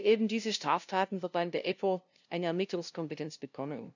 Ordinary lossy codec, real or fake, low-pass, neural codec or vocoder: none; fake; 7.2 kHz; codec, 16 kHz, 0.5 kbps, FunCodec, trained on LibriTTS, 25 frames a second